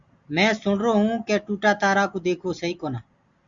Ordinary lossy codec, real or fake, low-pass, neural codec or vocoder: Opus, 64 kbps; real; 7.2 kHz; none